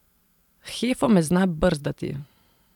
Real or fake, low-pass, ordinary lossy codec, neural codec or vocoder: fake; 19.8 kHz; none; vocoder, 44.1 kHz, 128 mel bands every 512 samples, BigVGAN v2